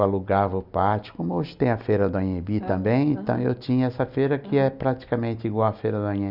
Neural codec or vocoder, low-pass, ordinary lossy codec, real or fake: none; 5.4 kHz; none; real